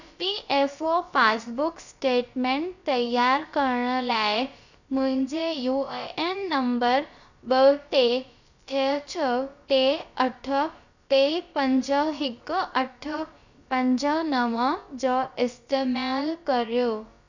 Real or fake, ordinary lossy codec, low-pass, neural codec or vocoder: fake; none; 7.2 kHz; codec, 16 kHz, about 1 kbps, DyCAST, with the encoder's durations